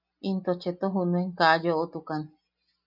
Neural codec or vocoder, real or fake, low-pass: none; real; 5.4 kHz